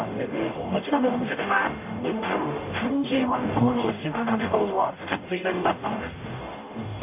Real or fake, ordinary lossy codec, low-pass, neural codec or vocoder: fake; AAC, 32 kbps; 3.6 kHz; codec, 44.1 kHz, 0.9 kbps, DAC